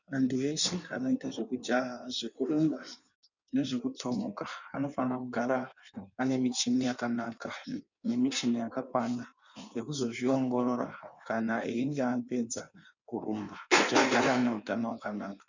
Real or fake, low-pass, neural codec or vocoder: fake; 7.2 kHz; codec, 16 kHz in and 24 kHz out, 1.1 kbps, FireRedTTS-2 codec